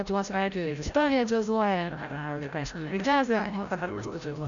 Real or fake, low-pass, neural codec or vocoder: fake; 7.2 kHz; codec, 16 kHz, 0.5 kbps, FreqCodec, larger model